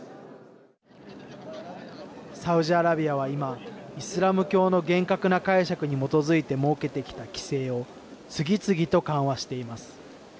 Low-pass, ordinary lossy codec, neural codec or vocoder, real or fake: none; none; none; real